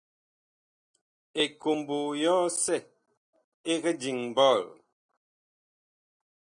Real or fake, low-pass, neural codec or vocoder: real; 9.9 kHz; none